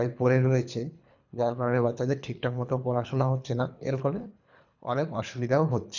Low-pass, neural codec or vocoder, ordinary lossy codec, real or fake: 7.2 kHz; codec, 24 kHz, 3 kbps, HILCodec; none; fake